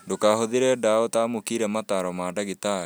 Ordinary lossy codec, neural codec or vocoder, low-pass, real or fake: none; none; none; real